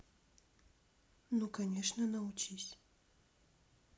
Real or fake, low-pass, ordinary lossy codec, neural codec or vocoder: real; none; none; none